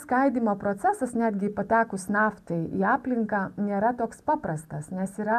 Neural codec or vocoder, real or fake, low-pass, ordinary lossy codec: none; real; 14.4 kHz; MP3, 96 kbps